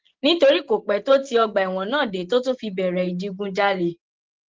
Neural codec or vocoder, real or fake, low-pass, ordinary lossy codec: none; real; 7.2 kHz; Opus, 16 kbps